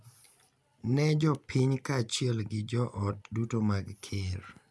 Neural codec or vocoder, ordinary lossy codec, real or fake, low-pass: none; none; real; none